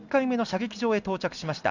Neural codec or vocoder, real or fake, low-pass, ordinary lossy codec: none; real; 7.2 kHz; none